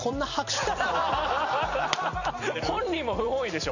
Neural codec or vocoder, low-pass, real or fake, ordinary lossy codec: vocoder, 44.1 kHz, 128 mel bands every 256 samples, BigVGAN v2; 7.2 kHz; fake; none